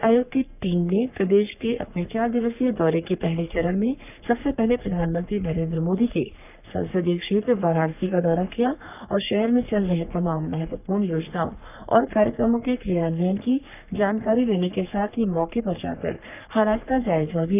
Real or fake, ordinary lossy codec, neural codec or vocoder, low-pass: fake; none; codec, 44.1 kHz, 3.4 kbps, Pupu-Codec; 3.6 kHz